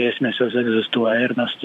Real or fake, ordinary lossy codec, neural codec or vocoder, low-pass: fake; MP3, 96 kbps; vocoder, 44.1 kHz, 128 mel bands every 512 samples, BigVGAN v2; 14.4 kHz